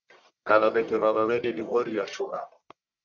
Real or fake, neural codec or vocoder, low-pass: fake; codec, 44.1 kHz, 1.7 kbps, Pupu-Codec; 7.2 kHz